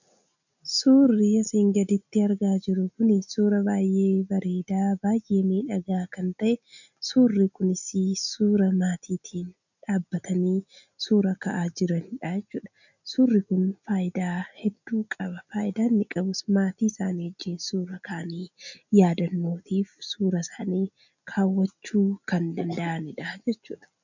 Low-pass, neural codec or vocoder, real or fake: 7.2 kHz; none; real